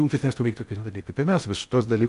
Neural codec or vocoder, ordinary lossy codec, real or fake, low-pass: codec, 16 kHz in and 24 kHz out, 0.6 kbps, FocalCodec, streaming, 4096 codes; MP3, 96 kbps; fake; 10.8 kHz